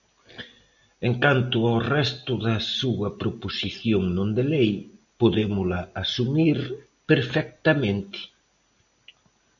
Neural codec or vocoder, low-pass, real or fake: none; 7.2 kHz; real